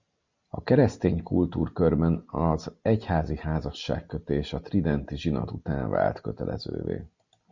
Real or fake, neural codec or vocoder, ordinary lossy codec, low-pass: real; none; Opus, 64 kbps; 7.2 kHz